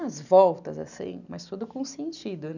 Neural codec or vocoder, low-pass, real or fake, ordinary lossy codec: none; 7.2 kHz; real; none